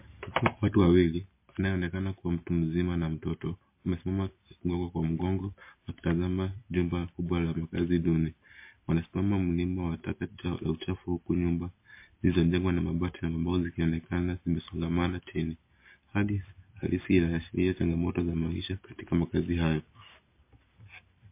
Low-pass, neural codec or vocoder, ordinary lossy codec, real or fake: 3.6 kHz; none; MP3, 24 kbps; real